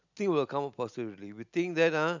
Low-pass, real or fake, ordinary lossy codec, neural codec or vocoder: 7.2 kHz; real; none; none